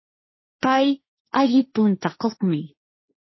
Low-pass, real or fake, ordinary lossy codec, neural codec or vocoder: 7.2 kHz; fake; MP3, 24 kbps; codec, 16 kHz, 1.1 kbps, Voila-Tokenizer